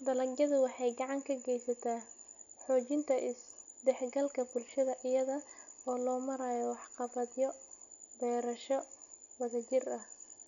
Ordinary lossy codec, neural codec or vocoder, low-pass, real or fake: none; none; 7.2 kHz; real